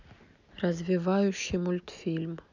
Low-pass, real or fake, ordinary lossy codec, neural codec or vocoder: 7.2 kHz; real; none; none